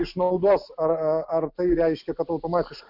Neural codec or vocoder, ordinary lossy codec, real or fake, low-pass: none; Opus, 64 kbps; real; 5.4 kHz